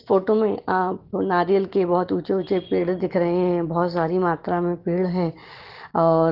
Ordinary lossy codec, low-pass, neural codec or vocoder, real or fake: Opus, 16 kbps; 5.4 kHz; none; real